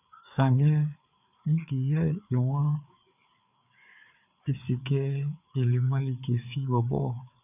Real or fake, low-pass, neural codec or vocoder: fake; 3.6 kHz; codec, 16 kHz, 4 kbps, FreqCodec, larger model